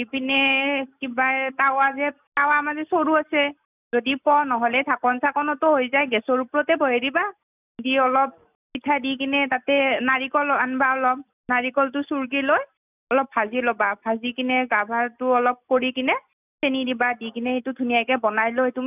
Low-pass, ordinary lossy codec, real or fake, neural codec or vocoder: 3.6 kHz; none; real; none